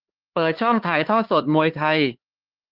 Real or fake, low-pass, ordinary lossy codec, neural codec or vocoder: fake; 5.4 kHz; Opus, 16 kbps; codec, 16 kHz, 4 kbps, X-Codec, HuBERT features, trained on LibriSpeech